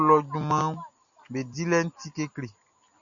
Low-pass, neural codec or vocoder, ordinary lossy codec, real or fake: 7.2 kHz; none; MP3, 96 kbps; real